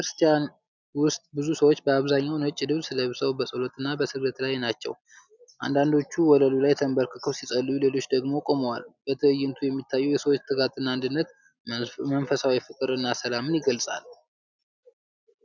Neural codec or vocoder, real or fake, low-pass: none; real; 7.2 kHz